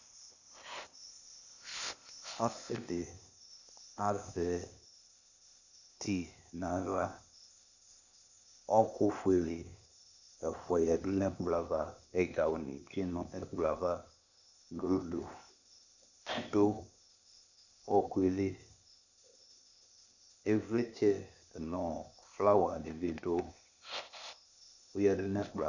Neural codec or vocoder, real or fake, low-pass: codec, 16 kHz, 0.8 kbps, ZipCodec; fake; 7.2 kHz